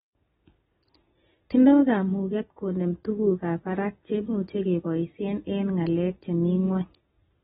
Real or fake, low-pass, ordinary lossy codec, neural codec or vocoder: real; 19.8 kHz; AAC, 16 kbps; none